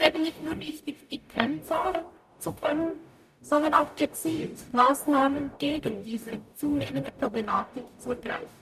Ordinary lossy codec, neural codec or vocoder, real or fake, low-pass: none; codec, 44.1 kHz, 0.9 kbps, DAC; fake; 14.4 kHz